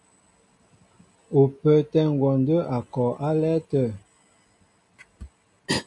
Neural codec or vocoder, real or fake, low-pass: none; real; 10.8 kHz